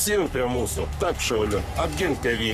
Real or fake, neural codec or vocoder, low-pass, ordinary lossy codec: fake; codec, 44.1 kHz, 3.4 kbps, Pupu-Codec; 14.4 kHz; Opus, 64 kbps